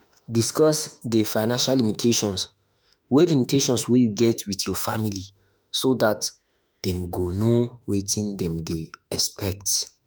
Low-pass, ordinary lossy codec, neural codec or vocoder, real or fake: none; none; autoencoder, 48 kHz, 32 numbers a frame, DAC-VAE, trained on Japanese speech; fake